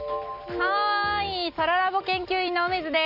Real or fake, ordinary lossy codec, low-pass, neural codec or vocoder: real; Opus, 64 kbps; 5.4 kHz; none